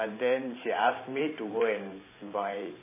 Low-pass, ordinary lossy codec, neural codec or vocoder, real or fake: 3.6 kHz; MP3, 16 kbps; vocoder, 44.1 kHz, 128 mel bands every 512 samples, BigVGAN v2; fake